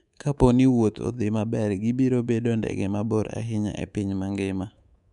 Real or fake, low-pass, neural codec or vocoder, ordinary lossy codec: fake; 10.8 kHz; codec, 24 kHz, 3.1 kbps, DualCodec; none